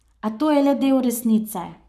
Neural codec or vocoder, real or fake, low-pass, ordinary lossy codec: codec, 44.1 kHz, 7.8 kbps, Pupu-Codec; fake; 14.4 kHz; none